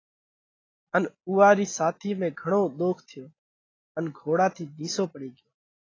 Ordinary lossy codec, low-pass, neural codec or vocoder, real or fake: AAC, 32 kbps; 7.2 kHz; none; real